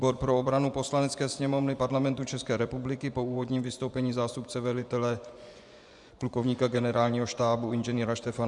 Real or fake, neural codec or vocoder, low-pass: fake; vocoder, 24 kHz, 100 mel bands, Vocos; 10.8 kHz